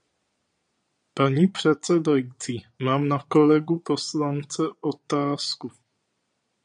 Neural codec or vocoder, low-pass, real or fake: vocoder, 22.05 kHz, 80 mel bands, Vocos; 9.9 kHz; fake